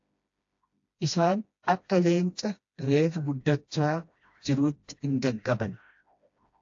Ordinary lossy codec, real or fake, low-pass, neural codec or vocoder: AAC, 48 kbps; fake; 7.2 kHz; codec, 16 kHz, 1 kbps, FreqCodec, smaller model